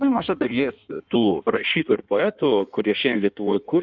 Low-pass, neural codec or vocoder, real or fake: 7.2 kHz; codec, 16 kHz in and 24 kHz out, 1.1 kbps, FireRedTTS-2 codec; fake